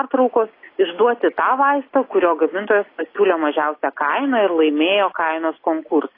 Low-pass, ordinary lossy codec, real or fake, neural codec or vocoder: 5.4 kHz; AAC, 24 kbps; real; none